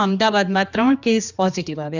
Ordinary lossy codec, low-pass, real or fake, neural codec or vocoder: none; 7.2 kHz; fake; codec, 16 kHz, 2 kbps, X-Codec, HuBERT features, trained on general audio